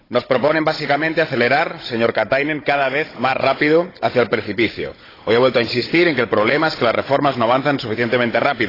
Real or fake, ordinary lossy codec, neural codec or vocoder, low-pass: fake; AAC, 24 kbps; codec, 16 kHz, 16 kbps, FunCodec, trained on Chinese and English, 50 frames a second; 5.4 kHz